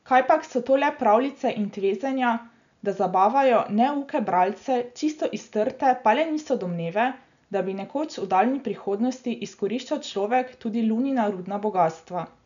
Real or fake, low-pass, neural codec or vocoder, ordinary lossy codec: real; 7.2 kHz; none; none